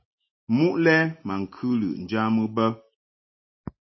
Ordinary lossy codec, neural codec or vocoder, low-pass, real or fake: MP3, 24 kbps; none; 7.2 kHz; real